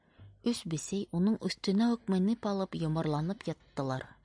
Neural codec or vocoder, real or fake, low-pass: none; real; 9.9 kHz